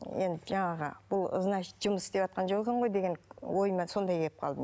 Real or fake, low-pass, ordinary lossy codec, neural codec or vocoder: real; none; none; none